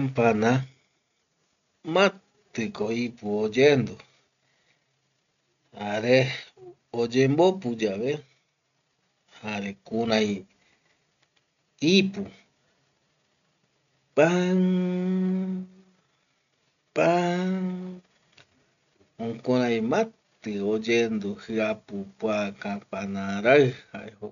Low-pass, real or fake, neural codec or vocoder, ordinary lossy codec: 7.2 kHz; real; none; none